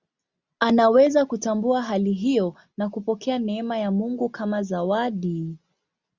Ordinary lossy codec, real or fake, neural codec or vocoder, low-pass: Opus, 64 kbps; real; none; 7.2 kHz